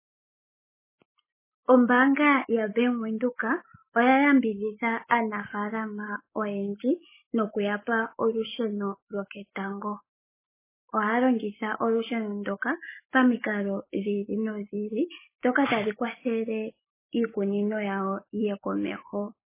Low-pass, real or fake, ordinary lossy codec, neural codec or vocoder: 3.6 kHz; real; MP3, 16 kbps; none